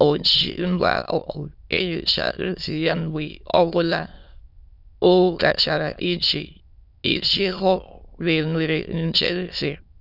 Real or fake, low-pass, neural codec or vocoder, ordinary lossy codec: fake; 5.4 kHz; autoencoder, 22.05 kHz, a latent of 192 numbers a frame, VITS, trained on many speakers; none